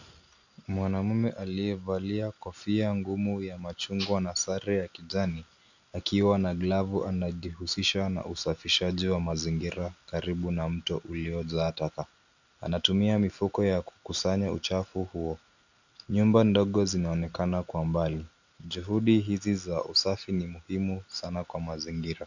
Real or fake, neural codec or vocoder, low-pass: real; none; 7.2 kHz